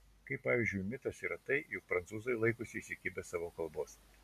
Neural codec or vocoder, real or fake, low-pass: none; real; 14.4 kHz